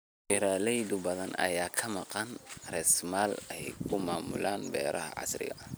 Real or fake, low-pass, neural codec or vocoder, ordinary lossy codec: fake; none; vocoder, 44.1 kHz, 128 mel bands every 256 samples, BigVGAN v2; none